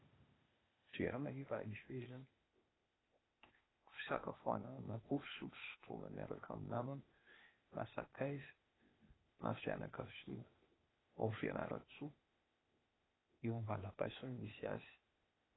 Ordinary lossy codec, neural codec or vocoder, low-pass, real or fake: AAC, 16 kbps; codec, 16 kHz, 0.8 kbps, ZipCodec; 7.2 kHz; fake